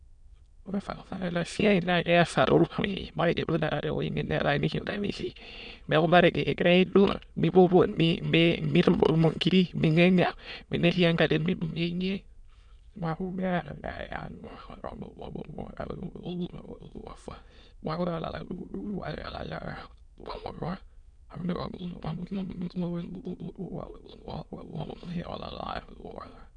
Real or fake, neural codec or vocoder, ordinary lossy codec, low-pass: fake; autoencoder, 22.05 kHz, a latent of 192 numbers a frame, VITS, trained on many speakers; none; 9.9 kHz